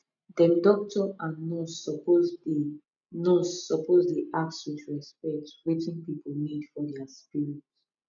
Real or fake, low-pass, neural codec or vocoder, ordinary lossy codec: real; 7.2 kHz; none; none